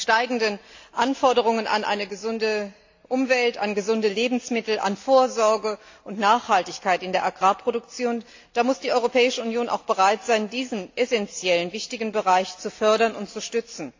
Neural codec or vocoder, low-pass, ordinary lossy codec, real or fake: none; 7.2 kHz; AAC, 48 kbps; real